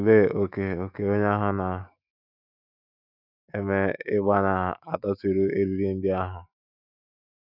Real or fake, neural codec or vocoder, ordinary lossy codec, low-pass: fake; autoencoder, 48 kHz, 128 numbers a frame, DAC-VAE, trained on Japanese speech; none; 5.4 kHz